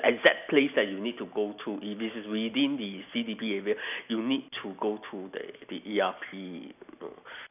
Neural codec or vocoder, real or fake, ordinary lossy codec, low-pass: none; real; none; 3.6 kHz